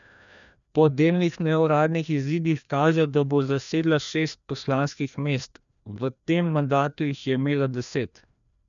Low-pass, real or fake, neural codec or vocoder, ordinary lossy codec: 7.2 kHz; fake; codec, 16 kHz, 1 kbps, FreqCodec, larger model; none